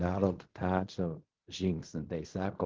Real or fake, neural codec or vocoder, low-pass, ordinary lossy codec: fake; codec, 16 kHz in and 24 kHz out, 0.4 kbps, LongCat-Audio-Codec, fine tuned four codebook decoder; 7.2 kHz; Opus, 16 kbps